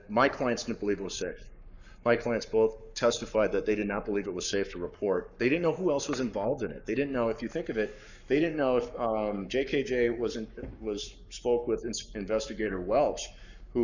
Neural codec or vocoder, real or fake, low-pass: codec, 44.1 kHz, 7.8 kbps, Pupu-Codec; fake; 7.2 kHz